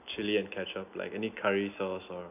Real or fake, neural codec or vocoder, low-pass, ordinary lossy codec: real; none; 3.6 kHz; none